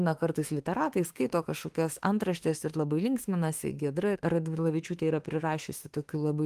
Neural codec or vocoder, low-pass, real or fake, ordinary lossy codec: autoencoder, 48 kHz, 32 numbers a frame, DAC-VAE, trained on Japanese speech; 14.4 kHz; fake; Opus, 24 kbps